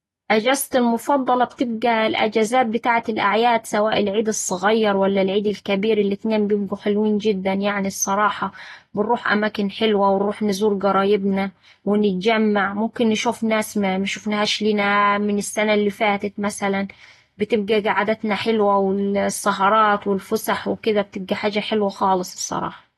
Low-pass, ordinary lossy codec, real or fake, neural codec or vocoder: 19.8 kHz; AAC, 32 kbps; real; none